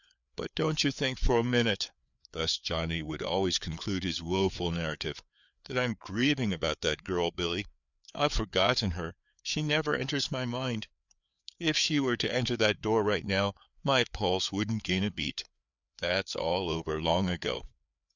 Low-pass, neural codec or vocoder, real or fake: 7.2 kHz; codec, 16 kHz, 4 kbps, FreqCodec, larger model; fake